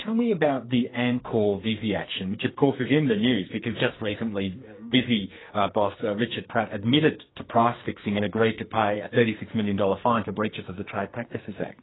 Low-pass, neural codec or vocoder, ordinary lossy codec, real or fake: 7.2 kHz; codec, 44.1 kHz, 2.6 kbps, SNAC; AAC, 16 kbps; fake